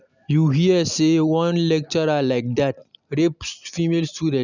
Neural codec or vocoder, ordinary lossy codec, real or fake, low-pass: none; none; real; 7.2 kHz